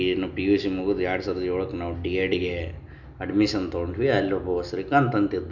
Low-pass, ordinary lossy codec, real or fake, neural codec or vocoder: 7.2 kHz; none; real; none